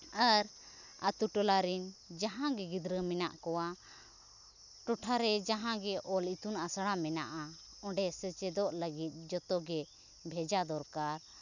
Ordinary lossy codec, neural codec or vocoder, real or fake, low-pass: none; none; real; 7.2 kHz